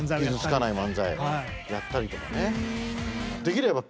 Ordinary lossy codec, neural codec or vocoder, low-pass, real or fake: none; none; none; real